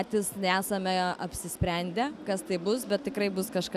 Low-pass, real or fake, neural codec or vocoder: 14.4 kHz; real; none